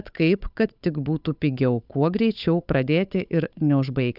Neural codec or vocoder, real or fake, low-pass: codec, 16 kHz, 8 kbps, FunCodec, trained on Chinese and English, 25 frames a second; fake; 5.4 kHz